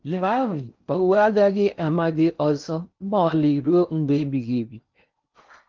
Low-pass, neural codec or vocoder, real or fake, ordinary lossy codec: 7.2 kHz; codec, 16 kHz in and 24 kHz out, 0.6 kbps, FocalCodec, streaming, 4096 codes; fake; Opus, 24 kbps